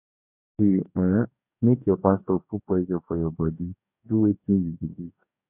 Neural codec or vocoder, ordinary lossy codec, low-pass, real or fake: codec, 44.1 kHz, 2.6 kbps, DAC; none; 3.6 kHz; fake